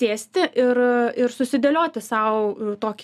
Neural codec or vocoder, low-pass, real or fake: none; 14.4 kHz; real